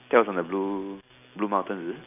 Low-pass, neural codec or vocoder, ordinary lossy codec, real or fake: 3.6 kHz; none; none; real